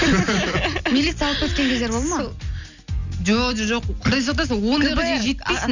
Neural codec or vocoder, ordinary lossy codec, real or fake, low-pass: none; none; real; 7.2 kHz